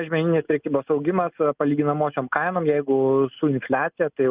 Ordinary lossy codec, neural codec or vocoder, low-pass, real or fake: Opus, 32 kbps; none; 3.6 kHz; real